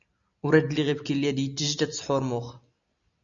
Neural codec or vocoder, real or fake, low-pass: none; real; 7.2 kHz